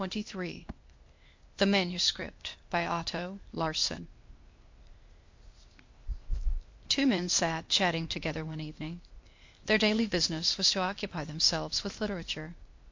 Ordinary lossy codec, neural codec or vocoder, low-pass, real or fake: MP3, 48 kbps; codec, 16 kHz, 0.8 kbps, ZipCodec; 7.2 kHz; fake